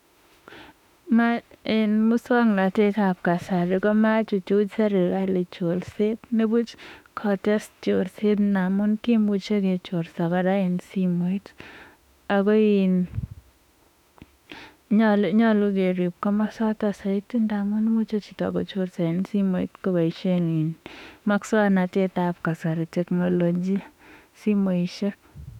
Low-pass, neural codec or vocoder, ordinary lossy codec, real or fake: 19.8 kHz; autoencoder, 48 kHz, 32 numbers a frame, DAC-VAE, trained on Japanese speech; none; fake